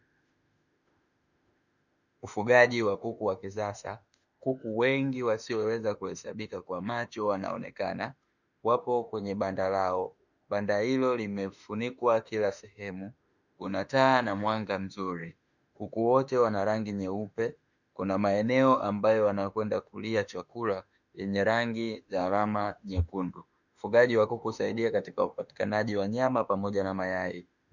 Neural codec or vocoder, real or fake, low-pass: autoencoder, 48 kHz, 32 numbers a frame, DAC-VAE, trained on Japanese speech; fake; 7.2 kHz